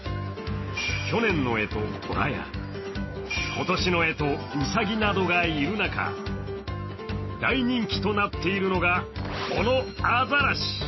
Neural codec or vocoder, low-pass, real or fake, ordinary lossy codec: none; 7.2 kHz; real; MP3, 24 kbps